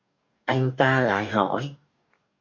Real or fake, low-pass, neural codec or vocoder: fake; 7.2 kHz; codec, 44.1 kHz, 2.6 kbps, DAC